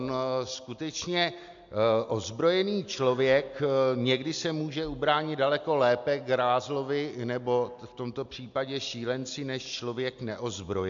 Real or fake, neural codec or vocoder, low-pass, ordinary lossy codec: real; none; 7.2 kHz; AAC, 64 kbps